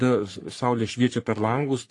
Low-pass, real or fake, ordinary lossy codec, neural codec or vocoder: 10.8 kHz; fake; AAC, 48 kbps; codec, 44.1 kHz, 3.4 kbps, Pupu-Codec